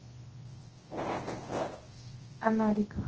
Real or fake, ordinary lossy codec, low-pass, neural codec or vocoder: fake; Opus, 16 kbps; 7.2 kHz; codec, 24 kHz, 0.9 kbps, WavTokenizer, large speech release